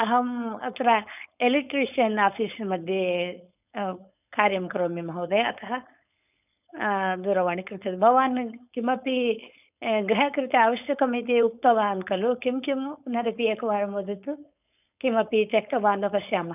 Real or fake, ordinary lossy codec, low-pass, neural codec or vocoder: fake; none; 3.6 kHz; codec, 16 kHz, 4.8 kbps, FACodec